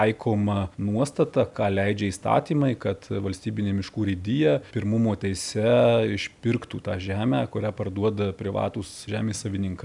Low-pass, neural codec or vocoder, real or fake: 10.8 kHz; none; real